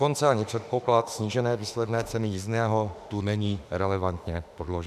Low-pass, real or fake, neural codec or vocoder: 14.4 kHz; fake; autoencoder, 48 kHz, 32 numbers a frame, DAC-VAE, trained on Japanese speech